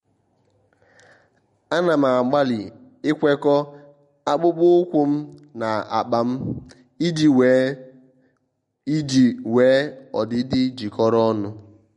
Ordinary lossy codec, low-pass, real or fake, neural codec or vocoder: MP3, 48 kbps; 10.8 kHz; real; none